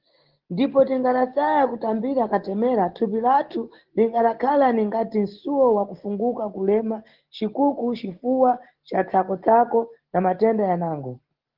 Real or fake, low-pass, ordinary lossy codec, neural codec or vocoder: real; 5.4 kHz; Opus, 16 kbps; none